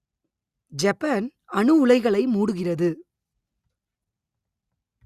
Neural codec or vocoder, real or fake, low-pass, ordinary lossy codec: none; real; 14.4 kHz; Opus, 64 kbps